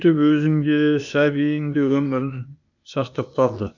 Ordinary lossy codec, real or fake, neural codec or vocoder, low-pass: none; fake; codec, 16 kHz, 0.8 kbps, ZipCodec; 7.2 kHz